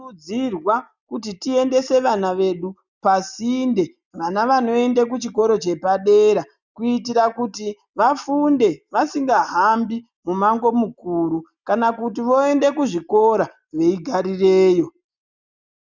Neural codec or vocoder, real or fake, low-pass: none; real; 7.2 kHz